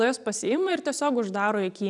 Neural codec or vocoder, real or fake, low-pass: vocoder, 44.1 kHz, 128 mel bands every 256 samples, BigVGAN v2; fake; 10.8 kHz